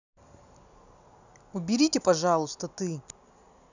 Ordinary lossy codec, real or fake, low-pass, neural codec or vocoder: none; real; 7.2 kHz; none